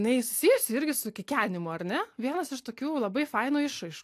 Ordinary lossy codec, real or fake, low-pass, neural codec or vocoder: AAC, 64 kbps; real; 14.4 kHz; none